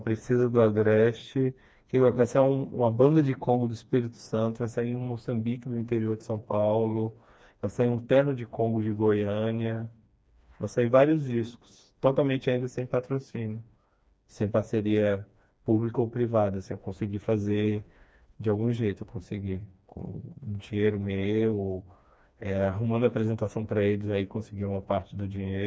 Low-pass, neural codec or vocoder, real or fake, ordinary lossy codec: none; codec, 16 kHz, 2 kbps, FreqCodec, smaller model; fake; none